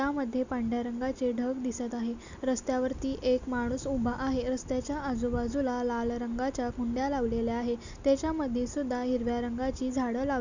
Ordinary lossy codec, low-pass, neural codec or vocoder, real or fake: none; 7.2 kHz; none; real